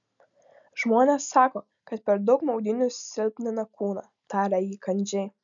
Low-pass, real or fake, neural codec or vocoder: 7.2 kHz; real; none